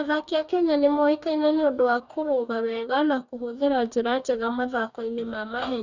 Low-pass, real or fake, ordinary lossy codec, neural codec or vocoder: 7.2 kHz; fake; none; codec, 44.1 kHz, 2.6 kbps, DAC